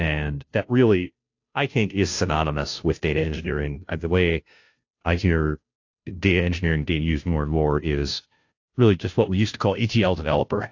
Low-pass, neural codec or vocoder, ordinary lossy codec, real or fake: 7.2 kHz; codec, 16 kHz, 0.5 kbps, FunCodec, trained on Chinese and English, 25 frames a second; AAC, 48 kbps; fake